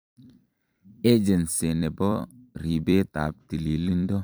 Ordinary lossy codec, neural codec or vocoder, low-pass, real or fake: none; vocoder, 44.1 kHz, 128 mel bands every 256 samples, BigVGAN v2; none; fake